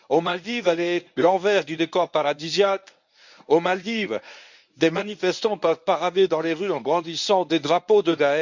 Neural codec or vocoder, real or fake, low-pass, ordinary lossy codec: codec, 24 kHz, 0.9 kbps, WavTokenizer, medium speech release version 1; fake; 7.2 kHz; none